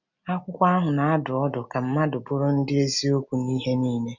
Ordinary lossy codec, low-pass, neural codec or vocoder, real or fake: none; 7.2 kHz; none; real